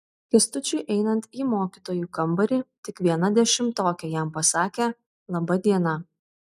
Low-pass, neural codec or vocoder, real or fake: 14.4 kHz; none; real